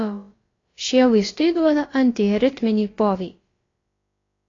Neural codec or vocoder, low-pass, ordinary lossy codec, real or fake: codec, 16 kHz, about 1 kbps, DyCAST, with the encoder's durations; 7.2 kHz; AAC, 32 kbps; fake